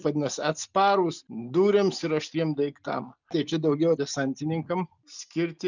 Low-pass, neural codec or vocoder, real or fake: 7.2 kHz; none; real